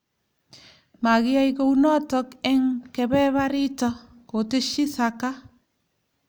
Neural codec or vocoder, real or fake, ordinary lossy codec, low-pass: none; real; none; none